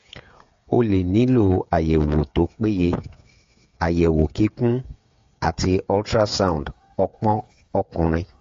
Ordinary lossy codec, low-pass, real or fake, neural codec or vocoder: AAC, 48 kbps; 7.2 kHz; fake; codec, 16 kHz, 8 kbps, FreqCodec, smaller model